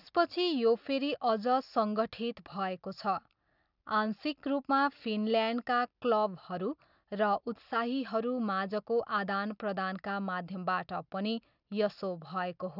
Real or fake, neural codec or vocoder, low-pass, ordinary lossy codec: real; none; 5.4 kHz; none